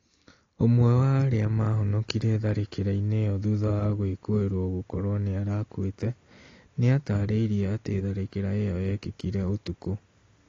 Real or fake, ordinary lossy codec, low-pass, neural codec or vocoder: real; AAC, 32 kbps; 7.2 kHz; none